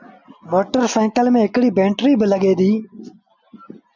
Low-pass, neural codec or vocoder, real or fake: 7.2 kHz; none; real